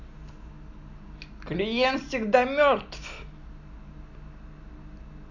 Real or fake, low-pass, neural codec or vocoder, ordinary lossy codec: real; 7.2 kHz; none; none